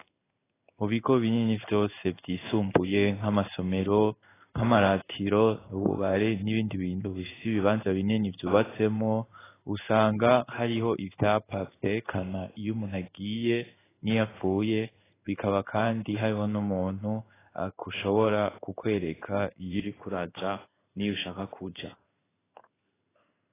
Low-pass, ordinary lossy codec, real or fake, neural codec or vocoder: 3.6 kHz; AAC, 16 kbps; fake; codec, 16 kHz in and 24 kHz out, 1 kbps, XY-Tokenizer